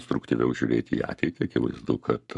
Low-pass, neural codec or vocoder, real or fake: 10.8 kHz; codec, 44.1 kHz, 7.8 kbps, Pupu-Codec; fake